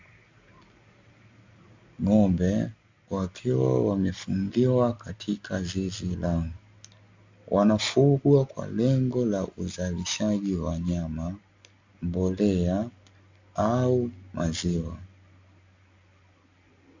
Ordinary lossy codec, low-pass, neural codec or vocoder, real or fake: MP3, 64 kbps; 7.2 kHz; none; real